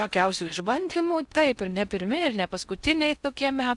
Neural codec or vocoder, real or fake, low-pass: codec, 16 kHz in and 24 kHz out, 0.6 kbps, FocalCodec, streaming, 4096 codes; fake; 10.8 kHz